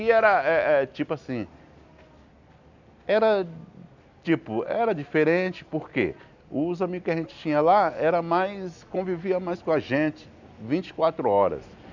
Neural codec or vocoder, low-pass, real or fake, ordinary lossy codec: none; 7.2 kHz; real; none